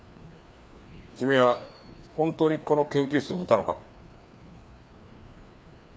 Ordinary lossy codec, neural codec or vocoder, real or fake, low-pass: none; codec, 16 kHz, 2 kbps, FreqCodec, larger model; fake; none